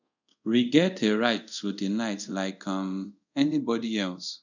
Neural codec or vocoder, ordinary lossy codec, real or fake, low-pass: codec, 24 kHz, 0.5 kbps, DualCodec; none; fake; 7.2 kHz